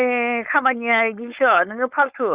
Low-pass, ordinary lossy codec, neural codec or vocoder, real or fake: 3.6 kHz; Opus, 64 kbps; codec, 16 kHz, 4.8 kbps, FACodec; fake